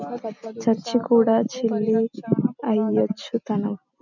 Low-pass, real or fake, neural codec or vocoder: 7.2 kHz; real; none